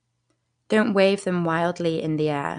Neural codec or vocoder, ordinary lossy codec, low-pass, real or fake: none; none; 9.9 kHz; real